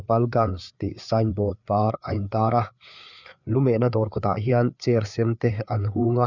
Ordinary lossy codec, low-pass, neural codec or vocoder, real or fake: none; 7.2 kHz; codec, 16 kHz, 4 kbps, FreqCodec, larger model; fake